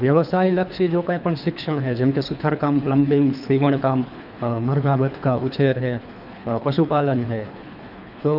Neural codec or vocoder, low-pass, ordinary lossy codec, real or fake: codec, 24 kHz, 3 kbps, HILCodec; 5.4 kHz; none; fake